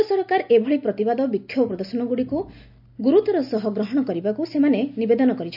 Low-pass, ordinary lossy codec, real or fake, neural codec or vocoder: 5.4 kHz; none; real; none